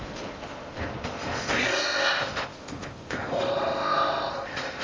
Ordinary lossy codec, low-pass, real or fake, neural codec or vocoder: Opus, 32 kbps; 7.2 kHz; fake; codec, 16 kHz in and 24 kHz out, 0.6 kbps, FocalCodec, streaming, 4096 codes